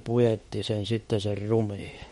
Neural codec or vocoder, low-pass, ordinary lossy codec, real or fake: autoencoder, 48 kHz, 32 numbers a frame, DAC-VAE, trained on Japanese speech; 19.8 kHz; MP3, 48 kbps; fake